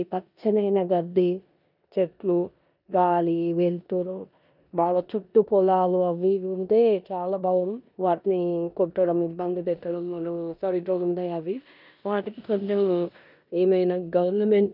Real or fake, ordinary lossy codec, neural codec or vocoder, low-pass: fake; none; codec, 16 kHz in and 24 kHz out, 0.9 kbps, LongCat-Audio-Codec, four codebook decoder; 5.4 kHz